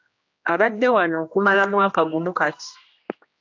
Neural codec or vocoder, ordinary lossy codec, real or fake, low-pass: codec, 16 kHz, 1 kbps, X-Codec, HuBERT features, trained on general audio; AAC, 48 kbps; fake; 7.2 kHz